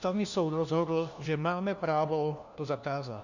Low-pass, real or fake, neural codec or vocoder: 7.2 kHz; fake; codec, 16 kHz, 1 kbps, FunCodec, trained on LibriTTS, 50 frames a second